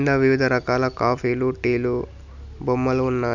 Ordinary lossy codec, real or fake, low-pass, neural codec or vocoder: none; real; 7.2 kHz; none